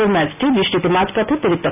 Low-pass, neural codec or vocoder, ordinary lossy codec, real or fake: 3.6 kHz; none; none; real